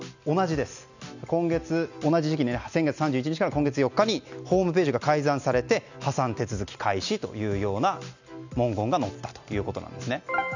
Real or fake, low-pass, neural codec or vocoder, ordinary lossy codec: real; 7.2 kHz; none; none